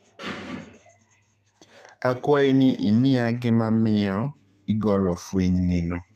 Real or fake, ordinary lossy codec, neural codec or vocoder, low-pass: fake; none; codec, 32 kHz, 1.9 kbps, SNAC; 14.4 kHz